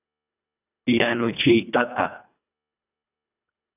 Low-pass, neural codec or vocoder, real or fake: 3.6 kHz; codec, 24 kHz, 1.5 kbps, HILCodec; fake